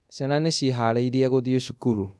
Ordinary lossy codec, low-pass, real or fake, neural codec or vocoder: none; none; fake; codec, 24 kHz, 0.5 kbps, DualCodec